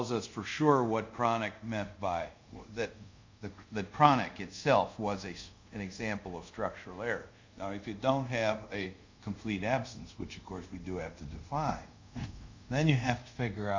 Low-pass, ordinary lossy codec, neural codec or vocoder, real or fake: 7.2 kHz; MP3, 64 kbps; codec, 24 kHz, 0.5 kbps, DualCodec; fake